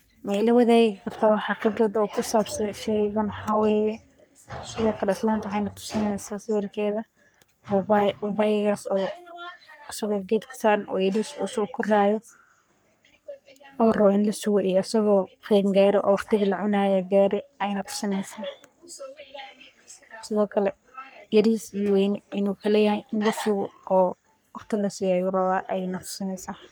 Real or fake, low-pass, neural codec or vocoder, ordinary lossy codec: fake; none; codec, 44.1 kHz, 3.4 kbps, Pupu-Codec; none